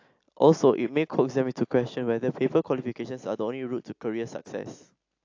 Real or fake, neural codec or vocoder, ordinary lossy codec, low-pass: real; none; AAC, 48 kbps; 7.2 kHz